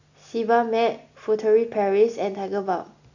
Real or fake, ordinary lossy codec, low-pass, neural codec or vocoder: real; none; 7.2 kHz; none